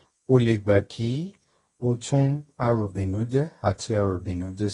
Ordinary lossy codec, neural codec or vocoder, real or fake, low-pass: AAC, 32 kbps; codec, 24 kHz, 0.9 kbps, WavTokenizer, medium music audio release; fake; 10.8 kHz